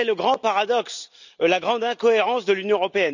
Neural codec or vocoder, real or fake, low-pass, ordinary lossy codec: none; real; 7.2 kHz; none